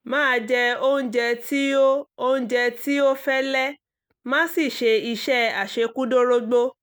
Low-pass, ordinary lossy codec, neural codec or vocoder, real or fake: none; none; none; real